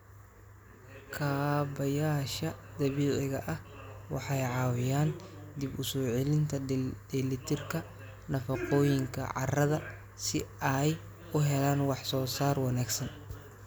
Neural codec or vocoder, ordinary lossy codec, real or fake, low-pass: none; none; real; none